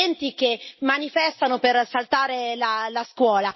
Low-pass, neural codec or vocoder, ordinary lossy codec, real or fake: 7.2 kHz; none; MP3, 24 kbps; real